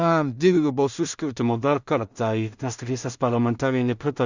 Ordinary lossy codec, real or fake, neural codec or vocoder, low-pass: Opus, 64 kbps; fake; codec, 16 kHz in and 24 kHz out, 0.4 kbps, LongCat-Audio-Codec, two codebook decoder; 7.2 kHz